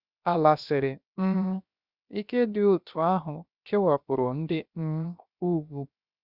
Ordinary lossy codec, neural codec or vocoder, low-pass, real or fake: Opus, 64 kbps; codec, 16 kHz, 0.7 kbps, FocalCodec; 5.4 kHz; fake